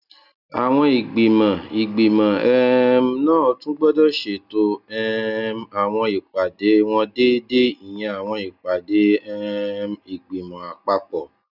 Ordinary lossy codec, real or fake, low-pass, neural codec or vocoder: none; real; 5.4 kHz; none